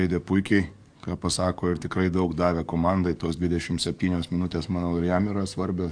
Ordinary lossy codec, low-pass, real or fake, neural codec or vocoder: AAC, 64 kbps; 9.9 kHz; fake; codec, 44.1 kHz, 7.8 kbps, DAC